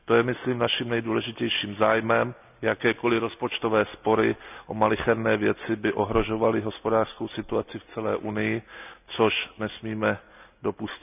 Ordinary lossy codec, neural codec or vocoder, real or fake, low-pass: none; none; real; 3.6 kHz